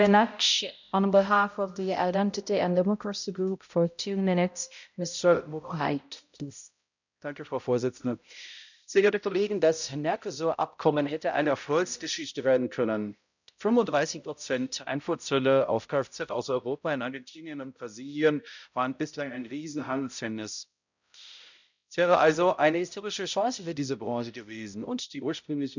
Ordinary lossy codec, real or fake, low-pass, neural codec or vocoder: none; fake; 7.2 kHz; codec, 16 kHz, 0.5 kbps, X-Codec, HuBERT features, trained on balanced general audio